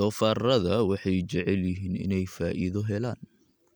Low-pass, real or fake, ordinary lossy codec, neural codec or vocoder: none; real; none; none